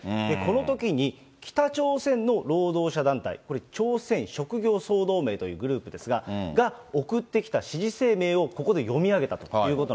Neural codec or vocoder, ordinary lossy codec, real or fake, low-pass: none; none; real; none